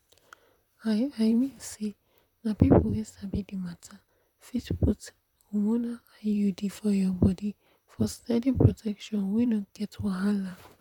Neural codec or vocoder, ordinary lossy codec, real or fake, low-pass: vocoder, 44.1 kHz, 128 mel bands, Pupu-Vocoder; none; fake; 19.8 kHz